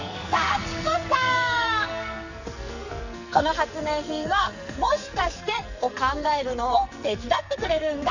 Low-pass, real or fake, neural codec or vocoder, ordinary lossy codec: 7.2 kHz; fake; codec, 44.1 kHz, 2.6 kbps, SNAC; none